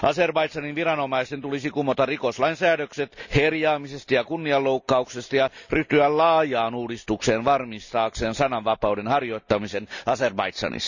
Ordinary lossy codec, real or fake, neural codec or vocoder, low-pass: none; real; none; 7.2 kHz